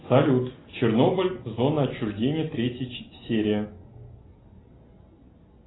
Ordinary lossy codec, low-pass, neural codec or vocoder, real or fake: AAC, 16 kbps; 7.2 kHz; none; real